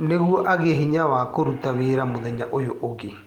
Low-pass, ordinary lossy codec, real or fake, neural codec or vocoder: 19.8 kHz; Opus, 24 kbps; real; none